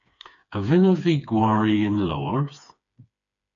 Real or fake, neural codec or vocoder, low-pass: fake; codec, 16 kHz, 4 kbps, FreqCodec, smaller model; 7.2 kHz